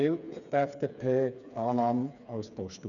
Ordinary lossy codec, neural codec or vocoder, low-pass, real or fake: none; codec, 16 kHz, 4 kbps, FreqCodec, smaller model; 7.2 kHz; fake